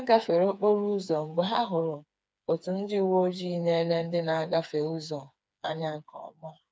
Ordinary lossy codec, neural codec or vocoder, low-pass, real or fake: none; codec, 16 kHz, 4 kbps, FreqCodec, smaller model; none; fake